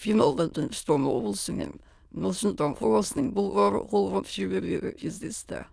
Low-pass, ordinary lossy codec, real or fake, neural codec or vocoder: none; none; fake; autoencoder, 22.05 kHz, a latent of 192 numbers a frame, VITS, trained on many speakers